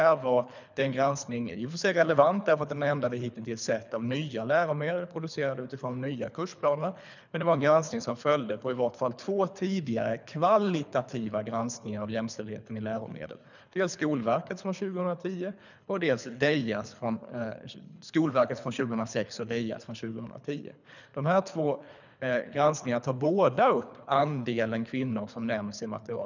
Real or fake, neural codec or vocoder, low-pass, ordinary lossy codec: fake; codec, 24 kHz, 3 kbps, HILCodec; 7.2 kHz; none